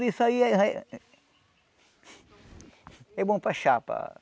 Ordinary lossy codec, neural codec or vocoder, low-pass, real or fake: none; none; none; real